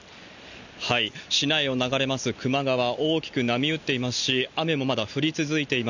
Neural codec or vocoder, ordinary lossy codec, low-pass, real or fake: none; none; 7.2 kHz; real